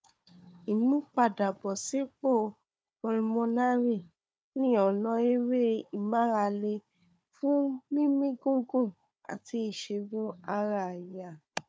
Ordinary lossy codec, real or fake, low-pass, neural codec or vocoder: none; fake; none; codec, 16 kHz, 4 kbps, FunCodec, trained on Chinese and English, 50 frames a second